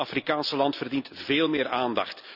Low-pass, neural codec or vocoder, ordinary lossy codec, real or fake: 5.4 kHz; none; none; real